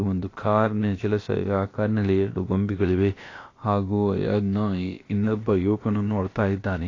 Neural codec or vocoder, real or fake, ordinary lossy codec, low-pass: codec, 16 kHz, about 1 kbps, DyCAST, with the encoder's durations; fake; AAC, 32 kbps; 7.2 kHz